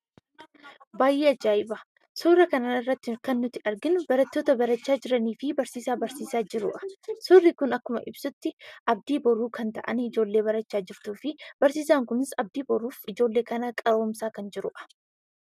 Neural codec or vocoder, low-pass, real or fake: none; 14.4 kHz; real